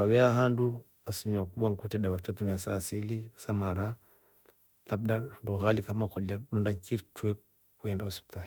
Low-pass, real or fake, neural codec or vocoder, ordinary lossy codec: none; fake; autoencoder, 48 kHz, 32 numbers a frame, DAC-VAE, trained on Japanese speech; none